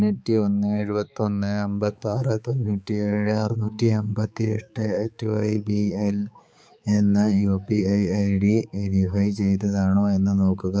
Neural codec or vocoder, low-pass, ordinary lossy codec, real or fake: codec, 16 kHz, 4 kbps, X-Codec, HuBERT features, trained on balanced general audio; none; none; fake